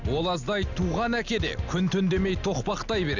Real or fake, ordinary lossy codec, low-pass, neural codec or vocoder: real; none; 7.2 kHz; none